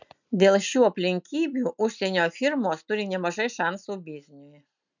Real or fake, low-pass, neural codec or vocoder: real; 7.2 kHz; none